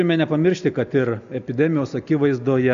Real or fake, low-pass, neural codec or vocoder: real; 7.2 kHz; none